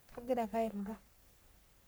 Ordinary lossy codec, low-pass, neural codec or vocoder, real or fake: none; none; codec, 44.1 kHz, 3.4 kbps, Pupu-Codec; fake